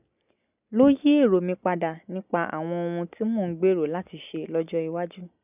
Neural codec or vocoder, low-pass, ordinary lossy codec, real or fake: none; 3.6 kHz; none; real